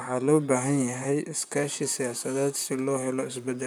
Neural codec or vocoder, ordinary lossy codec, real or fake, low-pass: vocoder, 44.1 kHz, 128 mel bands, Pupu-Vocoder; none; fake; none